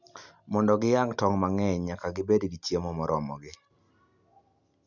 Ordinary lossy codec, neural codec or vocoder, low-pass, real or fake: none; none; 7.2 kHz; real